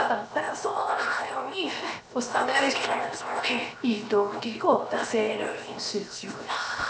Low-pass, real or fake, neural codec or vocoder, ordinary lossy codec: none; fake; codec, 16 kHz, 0.7 kbps, FocalCodec; none